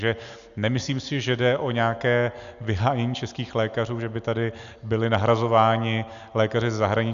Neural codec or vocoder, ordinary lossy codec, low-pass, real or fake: none; Opus, 64 kbps; 7.2 kHz; real